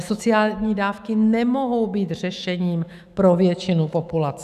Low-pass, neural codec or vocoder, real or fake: 14.4 kHz; autoencoder, 48 kHz, 128 numbers a frame, DAC-VAE, trained on Japanese speech; fake